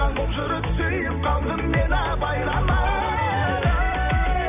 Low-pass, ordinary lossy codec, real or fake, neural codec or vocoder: 3.6 kHz; none; fake; vocoder, 44.1 kHz, 128 mel bands every 256 samples, BigVGAN v2